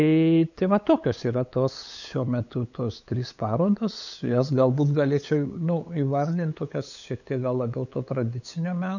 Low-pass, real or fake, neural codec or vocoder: 7.2 kHz; fake; codec, 16 kHz, 8 kbps, FunCodec, trained on LibriTTS, 25 frames a second